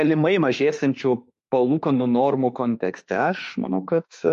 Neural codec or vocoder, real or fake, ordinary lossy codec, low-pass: autoencoder, 48 kHz, 32 numbers a frame, DAC-VAE, trained on Japanese speech; fake; MP3, 48 kbps; 14.4 kHz